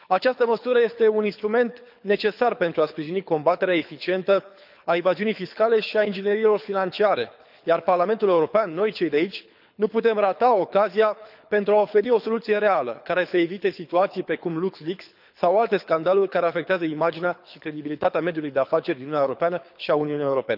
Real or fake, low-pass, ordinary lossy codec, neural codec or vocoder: fake; 5.4 kHz; none; codec, 24 kHz, 6 kbps, HILCodec